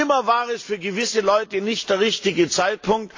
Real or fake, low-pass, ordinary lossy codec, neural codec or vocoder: real; 7.2 kHz; AAC, 48 kbps; none